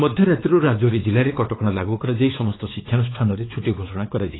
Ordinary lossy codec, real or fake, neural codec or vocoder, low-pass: AAC, 16 kbps; fake; codec, 16 kHz, 4 kbps, X-Codec, WavLM features, trained on Multilingual LibriSpeech; 7.2 kHz